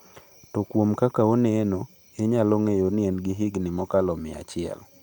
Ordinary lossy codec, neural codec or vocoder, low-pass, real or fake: Opus, 32 kbps; none; 19.8 kHz; real